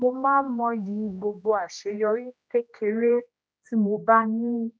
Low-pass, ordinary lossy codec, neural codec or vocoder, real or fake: none; none; codec, 16 kHz, 1 kbps, X-Codec, HuBERT features, trained on general audio; fake